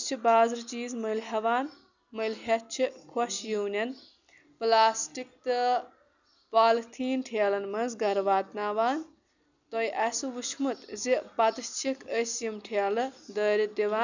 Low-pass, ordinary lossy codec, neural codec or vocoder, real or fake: 7.2 kHz; none; none; real